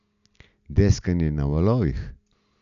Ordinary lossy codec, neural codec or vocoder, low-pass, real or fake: none; none; 7.2 kHz; real